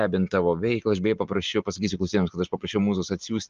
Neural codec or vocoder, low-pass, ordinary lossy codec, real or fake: none; 7.2 kHz; Opus, 32 kbps; real